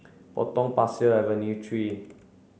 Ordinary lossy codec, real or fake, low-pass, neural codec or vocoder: none; real; none; none